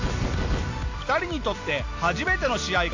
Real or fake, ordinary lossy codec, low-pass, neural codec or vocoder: real; AAC, 48 kbps; 7.2 kHz; none